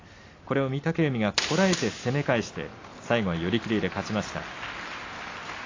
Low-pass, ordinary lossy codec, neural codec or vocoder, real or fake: 7.2 kHz; AAC, 32 kbps; none; real